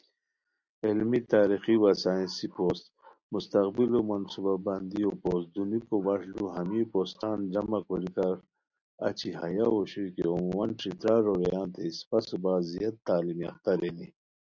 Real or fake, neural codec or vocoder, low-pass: real; none; 7.2 kHz